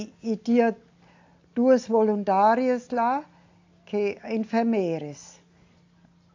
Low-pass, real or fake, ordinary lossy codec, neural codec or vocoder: 7.2 kHz; real; AAC, 48 kbps; none